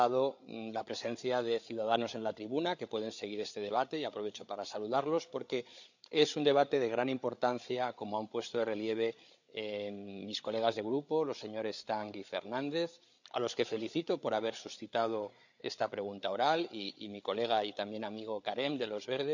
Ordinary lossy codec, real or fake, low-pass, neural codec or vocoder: none; fake; 7.2 kHz; codec, 16 kHz, 8 kbps, FreqCodec, larger model